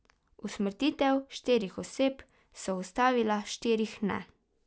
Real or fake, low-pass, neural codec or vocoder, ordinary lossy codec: real; none; none; none